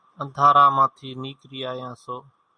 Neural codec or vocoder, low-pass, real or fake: none; 9.9 kHz; real